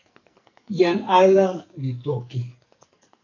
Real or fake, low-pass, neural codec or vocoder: fake; 7.2 kHz; codec, 44.1 kHz, 2.6 kbps, SNAC